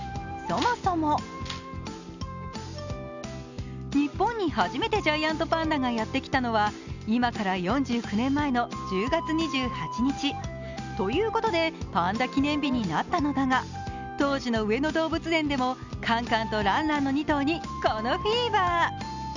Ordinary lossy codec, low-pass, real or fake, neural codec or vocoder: none; 7.2 kHz; real; none